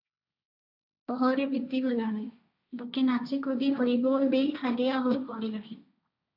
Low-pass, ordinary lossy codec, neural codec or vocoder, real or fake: 5.4 kHz; AAC, 48 kbps; codec, 16 kHz, 1.1 kbps, Voila-Tokenizer; fake